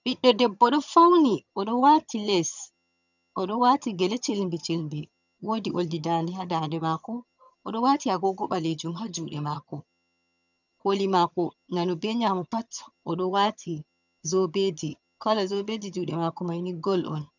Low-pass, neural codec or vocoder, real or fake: 7.2 kHz; vocoder, 22.05 kHz, 80 mel bands, HiFi-GAN; fake